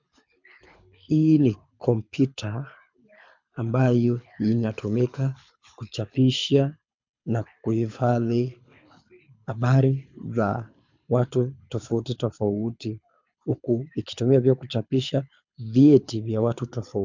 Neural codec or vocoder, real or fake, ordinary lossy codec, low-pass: codec, 24 kHz, 6 kbps, HILCodec; fake; MP3, 64 kbps; 7.2 kHz